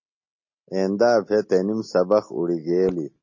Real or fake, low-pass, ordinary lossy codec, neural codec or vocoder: real; 7.2 kHz; MP3, 32 kbps; none